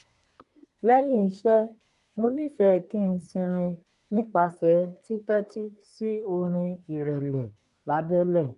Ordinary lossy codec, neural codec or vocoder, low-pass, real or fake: none; codec, 24 kHz, 1 kbps, SNAC; 10.8 kHz; fake